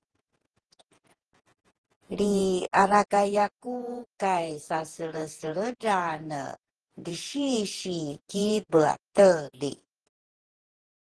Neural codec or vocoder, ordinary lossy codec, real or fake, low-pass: vocoder, 48 kHz, 128 mel bands, Vocos; Opus, 16 kbps; fake; 10.8 kHz